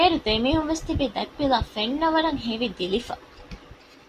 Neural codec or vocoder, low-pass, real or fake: none; 14.4 kHz; real